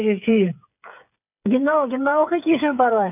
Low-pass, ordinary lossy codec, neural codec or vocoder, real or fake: 3.6 kHz; none; codec, 16 kHz, 8 kbps, FreqCodec, smaller model; fake